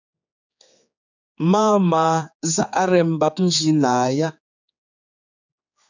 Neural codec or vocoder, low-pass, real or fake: codec, 16 kHz, 4 kbps, X-Codec, HuBERT features, trained on general audio; 7.2 kHz; fake